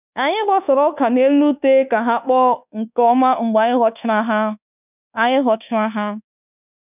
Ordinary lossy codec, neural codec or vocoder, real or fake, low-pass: none; codec, 24 kHz, 1.2 kbps, DualCodec; fake; 3.6 kHz